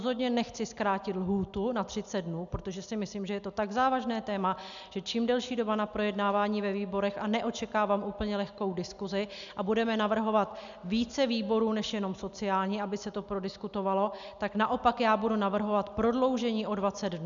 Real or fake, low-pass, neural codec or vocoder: real; 7.2 kHz; none